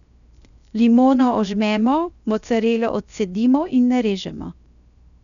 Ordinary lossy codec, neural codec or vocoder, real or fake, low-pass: none; codec, 16 kHz, 0.7 kbps, FocalCodec; fake; 7.2 kHz